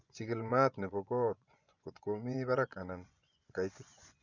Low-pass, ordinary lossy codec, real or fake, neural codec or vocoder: 7.2 kHz; none; fake; vocoder, 24 kHz, 100 mel bands, Vocos